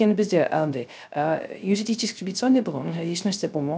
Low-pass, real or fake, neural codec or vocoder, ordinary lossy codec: none; fake; codec, 16 kHz, 0.3 kbps, FocalCodec; none